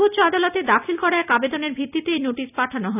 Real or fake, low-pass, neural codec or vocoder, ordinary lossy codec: real; 3.6 kHz; none; none